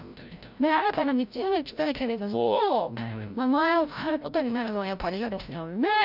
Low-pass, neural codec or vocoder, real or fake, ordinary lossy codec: 5.4 kHz; codec, 16 kHz, 0.5 kbps, FreqCodec, larger model; fake; none